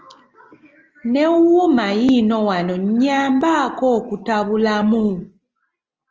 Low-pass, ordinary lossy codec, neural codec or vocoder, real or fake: 7.2 kHz; Opus, 24 kbps; none; real